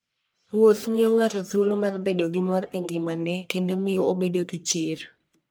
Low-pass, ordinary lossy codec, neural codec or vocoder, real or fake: none; none; codec, 44.1 kHz, 1.7 kbps, Pupu-Codec; fake